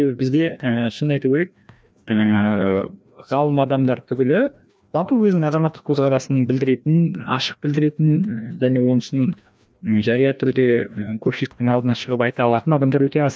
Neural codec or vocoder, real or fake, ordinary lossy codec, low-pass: codec, 16 kHz, 1 kbps, FreqCodec, larger model; fake; none; none